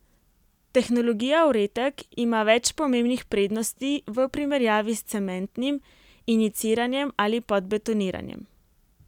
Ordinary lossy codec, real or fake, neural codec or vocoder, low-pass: none; real; none; 19.8 kHz